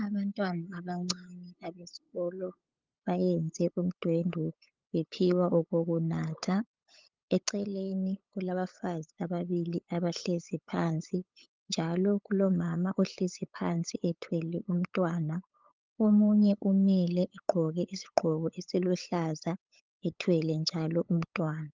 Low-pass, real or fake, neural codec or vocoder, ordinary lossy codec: 7.2 kHz; fake; codec, 16 kHz, 8 kbps, FunCodec, trained on LibriTTS, 25 frames a second; Opus, 24 kbps